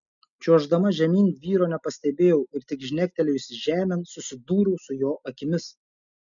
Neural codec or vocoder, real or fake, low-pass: none; real; 7.2 kHz